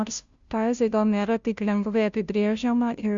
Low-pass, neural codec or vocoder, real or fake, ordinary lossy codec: 7.2 kHz; codec, 16 kHz, 0.5 kbps, FunCodec, trained on Chinese and English, 25 frames a second; fake; Opus, 64 kbps